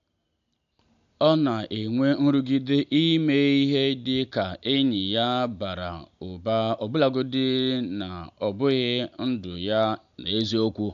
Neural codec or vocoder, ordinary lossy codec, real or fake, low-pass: none; AAC, 96 kbps; real; 7.2 kHz